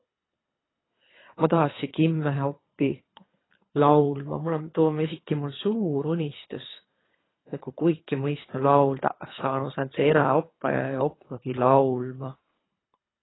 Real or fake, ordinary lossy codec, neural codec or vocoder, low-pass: fake; AAC, 16 kbps; codec, 24 kHz, 3 kbps, HILCodec; 7.2 kHz